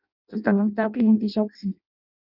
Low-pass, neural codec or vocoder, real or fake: 5.4 kHz; codec, 16 kHz in and 24 kHz out, 0.6 kbps, FireRedTTS-2 codec; fake